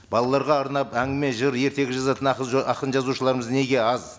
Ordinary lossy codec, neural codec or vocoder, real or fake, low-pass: none; none; real; none